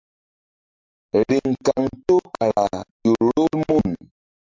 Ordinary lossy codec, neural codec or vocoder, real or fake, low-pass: MP3, 64 kbps; none; real; 7.2 kHz